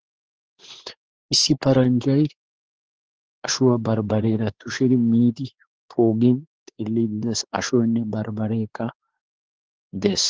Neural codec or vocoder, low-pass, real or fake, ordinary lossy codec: codec, 16 kHz, 4 kbps, X-Codec, WavLM features, trained on Multilingual LibriSpeech; 7.2 kHz; fake; Opus, 16 kbps